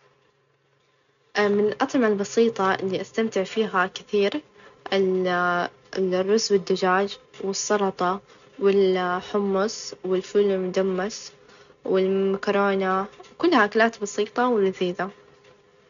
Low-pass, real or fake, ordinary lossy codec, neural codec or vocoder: 7.2 kHz; real; none; none